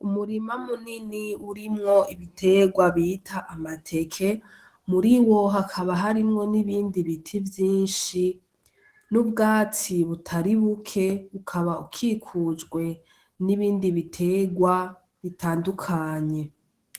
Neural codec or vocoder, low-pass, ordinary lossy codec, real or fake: autoencoder, 48 kHz, 128 numbers a frame, DAC-VAE, trained on Japanese speech; 14.4 kHz; Opus, 16 kbps; fake